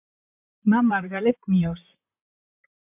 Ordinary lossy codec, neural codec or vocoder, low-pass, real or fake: MP3, 32 kbps; vocoder, 44.1 kHz, 128 mel bands, Pupu-Vocoder; 3.6 kHz; fake